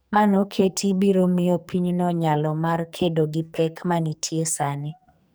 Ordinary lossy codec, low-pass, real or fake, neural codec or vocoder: none; none; fake; codec, 44.1 kHz, 2.6 kbps, SNAC